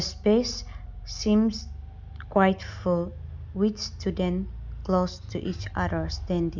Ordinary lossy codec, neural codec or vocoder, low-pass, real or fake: MP3, 64 kbps; none; 7.2 kHz; real